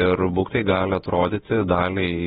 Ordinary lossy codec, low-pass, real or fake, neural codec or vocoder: AAC, 16 kbps; 19.8 kHz; fake; vocoder, 48 kHz, 128 mel bands, Vocos